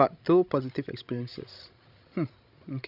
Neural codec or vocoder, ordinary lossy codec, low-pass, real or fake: codec, 16 kHz, 16 kbps, FreqCodec, larger model; none; 5.4 kHz; fake